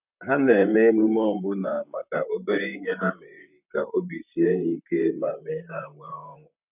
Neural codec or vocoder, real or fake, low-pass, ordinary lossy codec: vocoder, 44.1 kHz, 128 mel bands, Pupu-Vocoder; fake; 3.6 kHz; none